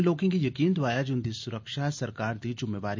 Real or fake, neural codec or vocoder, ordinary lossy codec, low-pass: real; none; MP3, 64 kbps; 7.2 kHz